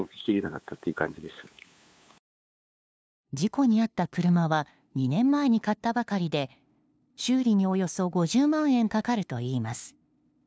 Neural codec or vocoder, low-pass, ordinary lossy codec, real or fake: codec, 16 kHz, 2 kbps, FunCodec, trained on LibriTTS, 25 frames a second; none; none; fake